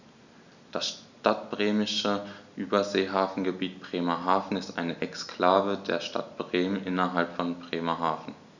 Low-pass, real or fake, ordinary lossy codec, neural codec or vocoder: 7.2 kHz; real; none; none